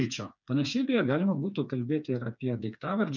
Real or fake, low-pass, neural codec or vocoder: fake; 7.2 kHz; codec, 16 kHz, 4 kbps, FreqCodec, smaller model